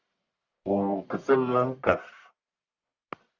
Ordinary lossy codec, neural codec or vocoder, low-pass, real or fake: Opus, 64 kbps; codec, 44.1 kHz, 1.7 kbps, Pupu-Codec; 7.2 kHz; fake